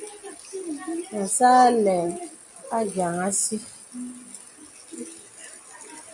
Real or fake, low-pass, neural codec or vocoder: real; 10.8 kHz; none